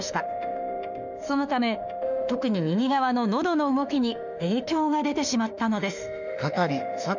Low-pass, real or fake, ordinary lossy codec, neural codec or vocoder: 7.2 kHz; fake; none; autoencoder, 48 kHz, 32 numbers a frame, DAC-VAE, trained on Japanese speech